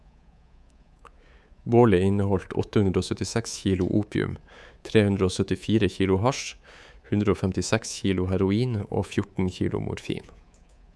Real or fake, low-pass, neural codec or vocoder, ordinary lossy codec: fake; none; codec, 24 kHz, 3.1 kbps, DualCodec; none